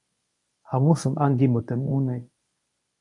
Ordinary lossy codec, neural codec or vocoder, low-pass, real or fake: AAC, 48 kbps; codec, 24 kHz, 0.9 kbps, WavTokenizer, medium speech release version 1; 10.8 kHz; fake